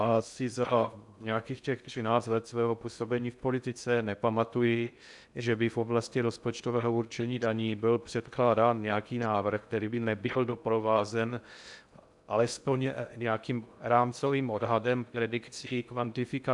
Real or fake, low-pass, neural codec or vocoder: fake; 10.8 kHz; codec, 16 kHz in and 24 kHz out, 0.6 kbps, FocalCodec, streaming, 2048 codes